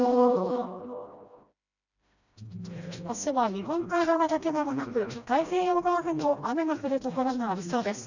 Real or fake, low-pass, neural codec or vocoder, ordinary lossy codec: fake; 7.2 kHz; codec, 16 kHz, 1 kbps, FreqCodec, smaller model; none